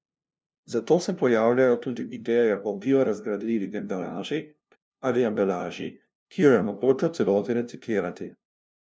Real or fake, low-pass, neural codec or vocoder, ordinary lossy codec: fake; none; codec, 16 kHz, 0.5 kbps, FunCodec, trained on LibriTTS, 25 frames a second; none